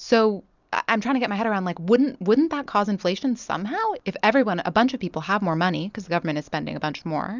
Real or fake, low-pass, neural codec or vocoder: real; 7.2 kHz; none